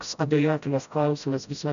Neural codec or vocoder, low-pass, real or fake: codec, 16 kHz, 0.5 kbps, FreqCodec, smaller model; 7.2 kHz; fake